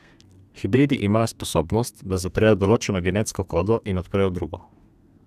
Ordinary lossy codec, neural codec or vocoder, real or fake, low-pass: none; codec, 32 kHz, 1.9 kbps, SNAC; fake; 14.4 kHz